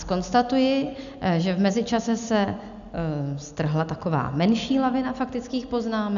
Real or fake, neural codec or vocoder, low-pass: real; none; 7.2 kHz